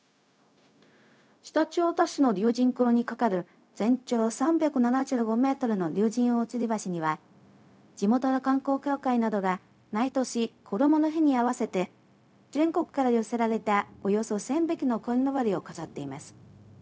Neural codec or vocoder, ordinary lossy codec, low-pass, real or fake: codec, 16 kHz, 0.4 kbps, LongCat-Audio-Codec; none; none; fake